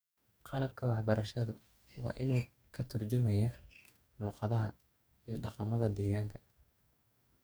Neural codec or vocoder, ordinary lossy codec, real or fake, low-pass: codec, 44.1 kHz, 2.6 kbps, DAC; none; fake; none